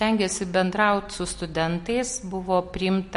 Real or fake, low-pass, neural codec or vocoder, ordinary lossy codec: real; 10.8 kHz; none; MP3, 48 kbps